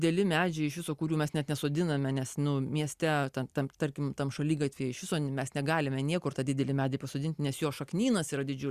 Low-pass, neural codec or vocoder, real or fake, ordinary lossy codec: 14.4 kHz; none; real; Opus, 64 kbps